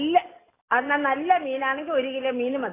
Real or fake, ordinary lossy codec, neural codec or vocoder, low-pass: real; AAC, 16 kbps; none; 3.6 kHz